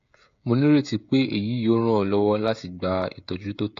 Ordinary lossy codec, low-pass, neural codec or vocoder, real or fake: AAC, 48 kbps; 7.2 kHz; codec, 16 kHz, 16 kbps, FreqCodec, smaller model; fake